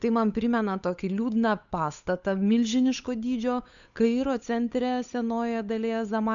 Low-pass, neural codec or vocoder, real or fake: 7.2 kHz; codec, 16 kHz, 8 kbps, FunCodec, trained on Chinese and English, 25 frames a second; fake